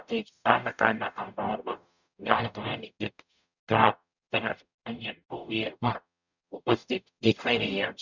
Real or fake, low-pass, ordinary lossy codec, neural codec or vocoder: fake; 7.2 kHz; none; codec, 44.1 kHz, 0.9 kbps, DAC